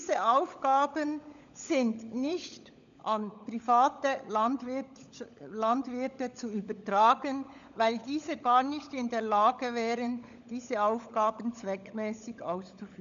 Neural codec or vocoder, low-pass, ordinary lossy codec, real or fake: codec, 16 kHz, 16 kbps, FunCodec, trained on LibriTTS, 50 frames a second; 7.2 kHz; none; fake